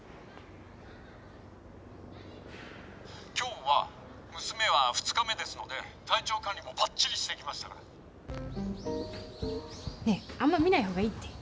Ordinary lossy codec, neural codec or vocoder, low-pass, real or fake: none; none; none; real